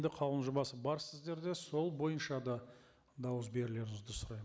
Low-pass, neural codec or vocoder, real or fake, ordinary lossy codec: none; none; real; none